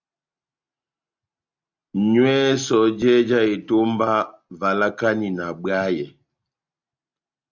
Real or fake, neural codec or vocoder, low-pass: real; none; 7.2 kHz